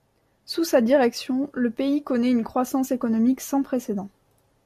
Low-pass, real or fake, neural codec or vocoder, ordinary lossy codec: 14.4 kHz; real; none; MP3, 96 kbps